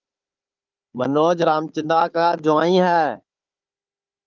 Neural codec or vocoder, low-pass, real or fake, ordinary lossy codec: codec, 16 kHz, 4 kbps, FunCodec, trained on Chinese and English, 50 frames a second; 7.2 kHz; fake; Opus, 24 kbps